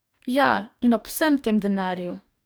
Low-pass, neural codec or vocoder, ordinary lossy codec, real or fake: none; codec, 44.1 kHz, 2.6 kbps, DAC; none; fake